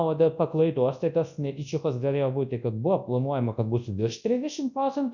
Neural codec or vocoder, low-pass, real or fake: codec, 24 kHz, 0.9 kbps, WavTokenizer, large speech release; 7.2 kHz; fake